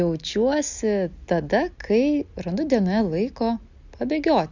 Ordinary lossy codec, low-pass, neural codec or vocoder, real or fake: Opus, 64 kbps; 7.2 kHz; none; real